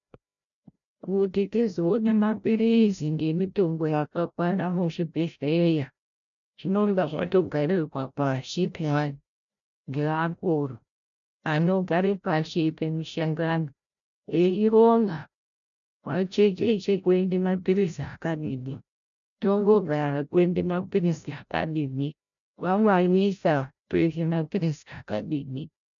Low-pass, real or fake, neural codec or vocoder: 7.2 kHz; fake; codec, 16 kHz, 0.5 kbps, FreqCodec, larger model